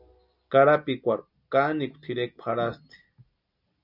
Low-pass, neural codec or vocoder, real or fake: 5.4 kHz; none; real